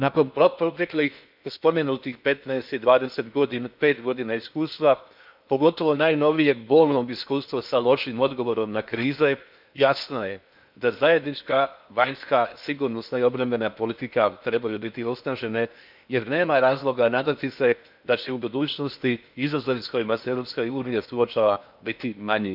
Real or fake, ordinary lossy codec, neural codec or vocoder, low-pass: fake; none; codec, 16 kHz in and 24 kHz out, 0.8 kbps, FocalCodec, streaming, 65536 codes; 5.4 kHz